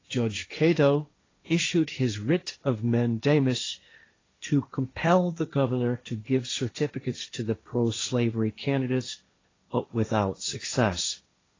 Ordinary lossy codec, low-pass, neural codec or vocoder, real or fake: AAC, 32 kbps; 7.2 kHz; codec, 16 kHz, 1.1 kbps, Voila-Tokenizer; fake